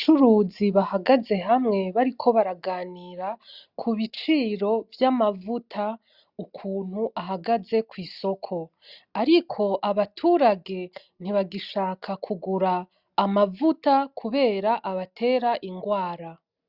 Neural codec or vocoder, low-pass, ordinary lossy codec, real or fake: none; 5.4 kHz; Opus, 64 kbps; real